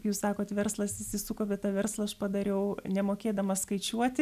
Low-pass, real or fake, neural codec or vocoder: 14.4 kHz; real; none